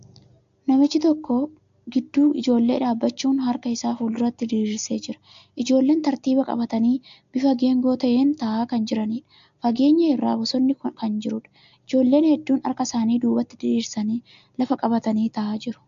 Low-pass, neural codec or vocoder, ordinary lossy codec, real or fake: 7.2 kHz; none; AAC, 64 kbps; real